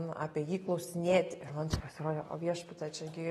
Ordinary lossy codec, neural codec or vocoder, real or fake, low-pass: AAC, 32 kbps; none; real; 19.8 kHz